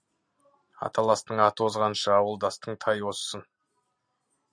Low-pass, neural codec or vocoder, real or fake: 9.9 kHz; none; real